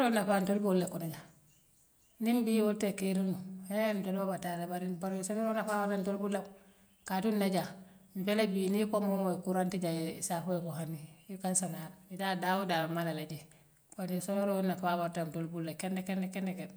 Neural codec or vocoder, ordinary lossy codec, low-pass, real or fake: vocoder, 48 kHz, 128 mel bands, Vocos; none; none; fake